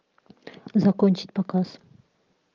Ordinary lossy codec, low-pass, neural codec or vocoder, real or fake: Opus, 32 kbps; 7.2 kHz; none; real